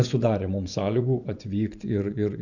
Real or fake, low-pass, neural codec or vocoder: fake; 7.2 kHz; vocoder, 24 kHz, 100 mel bands, Vocos